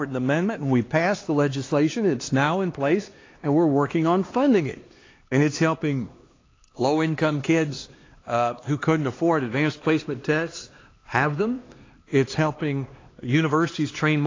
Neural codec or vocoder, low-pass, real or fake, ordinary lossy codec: codec, 16 kHz, 2 kbps, X-Codec, HuBERT features, trained on LibriSpeech; 7.2 kHz; fake; AAC, 32 kbps